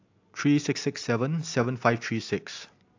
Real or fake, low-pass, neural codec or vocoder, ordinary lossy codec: real; 7.2 kHz; none; AAC, 48 kbps